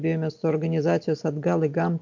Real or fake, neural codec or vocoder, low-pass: real; none; 7.2 kHz